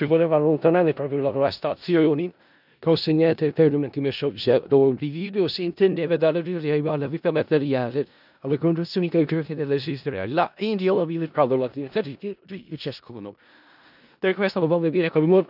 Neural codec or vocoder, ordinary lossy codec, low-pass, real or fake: codec, 16 kHz in and 24 kHz out, 0.4 kbps, LongCat-Audio-Codec, four codebook decoder; none; 5.4 kHz; fake